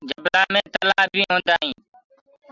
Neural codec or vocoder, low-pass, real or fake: none; 7.2 kHz; real